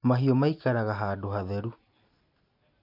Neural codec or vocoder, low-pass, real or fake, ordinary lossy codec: none; 5.4 kHz; real; none